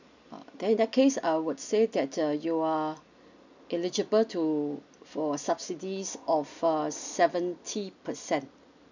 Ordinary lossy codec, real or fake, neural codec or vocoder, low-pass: none; real; none; 7.2 kHz